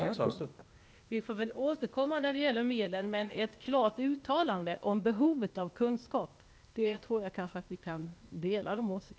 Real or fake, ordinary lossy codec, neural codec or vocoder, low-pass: fake; none; codec, 16 kHz, 0.8 kbps, ZipCodec; none